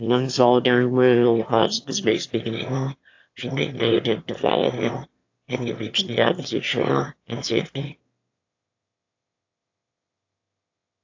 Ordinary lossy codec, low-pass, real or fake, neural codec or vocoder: AAC, 48 kbps; 7.2 kHz; fake; autoencoder, 22.05 kHz, a latent of 192 numbers a frame, VITS, trained on one speaker